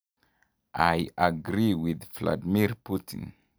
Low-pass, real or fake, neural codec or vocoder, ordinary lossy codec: none; real; none; none